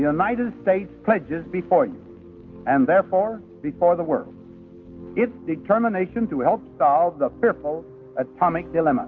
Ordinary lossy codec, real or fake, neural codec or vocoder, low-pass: Opus, 24 kbps; real; none; 7.2 kHz